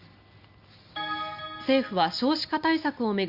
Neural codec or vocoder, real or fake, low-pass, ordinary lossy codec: none; real; 5.4 kHz; Opus, 64 kbps